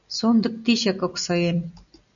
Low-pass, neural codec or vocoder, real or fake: 7.2 kHz; none; real